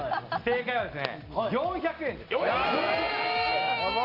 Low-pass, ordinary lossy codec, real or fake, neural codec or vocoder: 5.4 kHz; Opus, 32 kbps; real; none